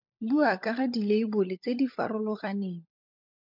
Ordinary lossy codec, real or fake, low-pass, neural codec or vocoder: MP3, 48 kbps; fake; 5.4 kHz; codec, 16 kHz, 16 kbps, FunCodec, trained on LibriTTS, 50 frames a second